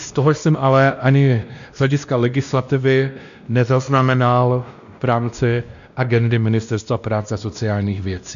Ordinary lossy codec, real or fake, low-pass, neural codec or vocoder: AAC, 96 kbps; fake; 7.2 kHz; codec, 16 kHz, 1 kbps, X-Codec, WavLM features, trained on Multilingual LibriSpeech